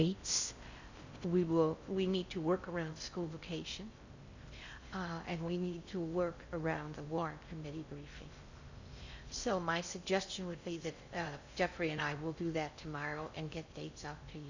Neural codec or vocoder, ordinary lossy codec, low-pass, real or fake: codec, 16 kHz in and 24 kHz out, 0.6 kbps, FocalCodec, streaming, 4096 codes; Opus, 64 kbps; 7.2 kHz; fake